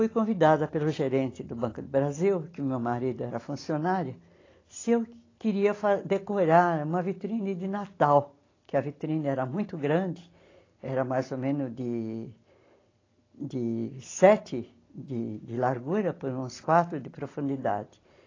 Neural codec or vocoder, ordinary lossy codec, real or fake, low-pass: none; AAC, 32 kbps; real; 7.2 kHz